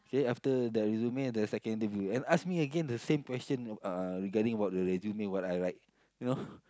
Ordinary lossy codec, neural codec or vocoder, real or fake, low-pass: none; none; real; none